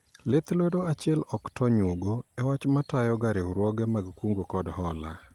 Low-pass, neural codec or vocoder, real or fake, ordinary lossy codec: 19.8 kHz; none; real; Opus, 24 kbps